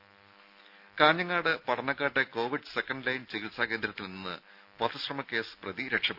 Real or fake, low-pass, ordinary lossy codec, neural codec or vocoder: real; 5.4 kHz; none; none